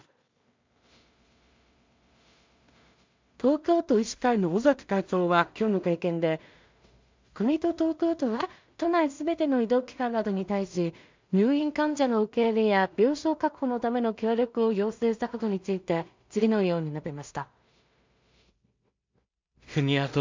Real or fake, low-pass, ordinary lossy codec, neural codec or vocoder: fake; 7.2 kHz; MP3, 64 kbps; codec, 16 kHz in and 24 kHz out, 0.4 kbps, LongCat-Audio-Codec, two codebook decoder